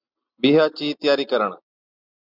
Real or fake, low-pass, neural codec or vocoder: real; 5.4 kHz; none